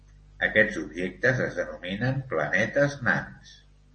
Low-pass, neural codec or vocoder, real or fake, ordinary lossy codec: 9.9 kHz; none; real; MP3, 32 kbps